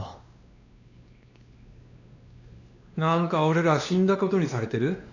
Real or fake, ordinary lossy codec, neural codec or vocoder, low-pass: fake; none; codec, 16 kHz, 2 kbps, X-Codec, WavLM features, trained on Multilingual LibriSpeech; 7.2 kHz